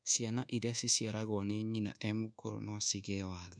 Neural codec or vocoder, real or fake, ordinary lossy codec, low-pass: codec, 24 kHz, 1.2 kbps, DualCodec; fake; none; 9.9 kHz